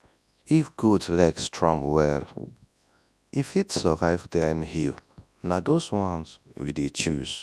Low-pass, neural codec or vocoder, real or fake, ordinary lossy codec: none; codec, 24 kHz, 0.9 kbps, WavTokenizer, large speech release; fake; none